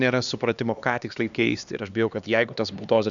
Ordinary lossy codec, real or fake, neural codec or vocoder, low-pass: Opus, 64 kbps; fake; codec, 16 kHz, 2 kbps, X-Codec, HuBERT features, trained on LibriSpeech; 7.2 kHz